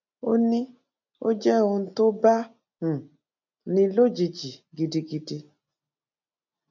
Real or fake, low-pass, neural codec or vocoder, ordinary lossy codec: real; 7.2 kHz; none; none